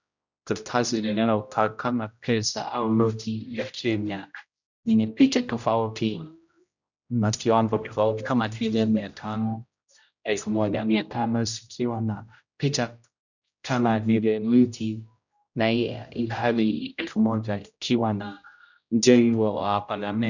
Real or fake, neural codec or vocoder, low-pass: fake; codec, 16 kHz, 0.5 kbps, X-Codec, HuBERT features, trained on general audio; 7.2 kHz